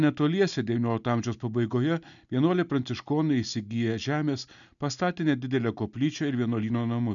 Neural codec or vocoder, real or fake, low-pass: none; real; 7.2 kHz